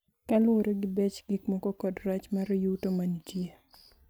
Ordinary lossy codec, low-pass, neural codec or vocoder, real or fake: none; none; none; real